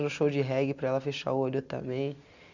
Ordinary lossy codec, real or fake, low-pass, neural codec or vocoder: none; real; 7.2 kHz; none